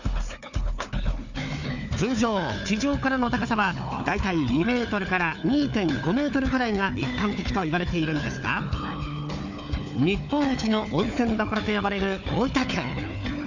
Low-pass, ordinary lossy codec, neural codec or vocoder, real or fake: 7.2 kHz; none; codec, 16 kHz, 4 kbps, FunCodec, trained on Chinese and English, 50 frames a second; fake